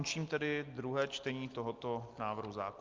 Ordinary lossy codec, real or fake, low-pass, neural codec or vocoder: Opus, 24 kbps; real; 7.2 kHz; none